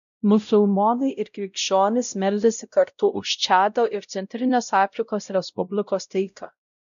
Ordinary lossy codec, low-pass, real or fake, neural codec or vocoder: MP3, 96 kbps; 7.2 kHz; fake; codec, 16 kHz, 0.5 kbps, X-Codec, WavLM features, trained on Multilingual LibriSpeech